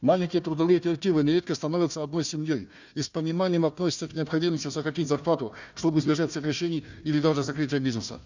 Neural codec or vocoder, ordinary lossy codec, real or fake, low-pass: codec, 16 kHz, 1 kbps, FunCodec, trained on Chinese and English, 50 frames a second; none; fake; 7.2 kHz